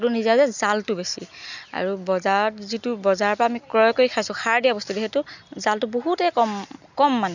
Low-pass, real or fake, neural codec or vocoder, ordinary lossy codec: 7.2 kHz; real; none; none